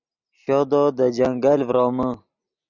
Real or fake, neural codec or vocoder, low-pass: real; none; 7.2 kHz